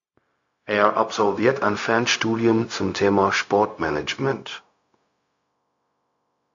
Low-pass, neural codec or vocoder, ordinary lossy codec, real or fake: 7.2 kHz; codec, 16 kHz, 0.4 kbps, LongCat-Audio-Codec; AAC, 48 kbps; fake